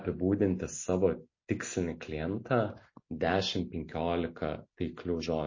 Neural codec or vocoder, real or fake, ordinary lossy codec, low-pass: none; real; MP3, 32 kbps; 7.2 kHz